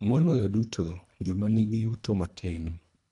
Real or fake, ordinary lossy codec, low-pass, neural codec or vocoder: fake; none; 10.8 kHz; codec, 24 kHz, 1.5 kbps, HILCodec